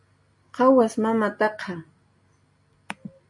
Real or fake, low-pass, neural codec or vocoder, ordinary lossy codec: real; 10.8 kHz; none; MP3, 48 kbps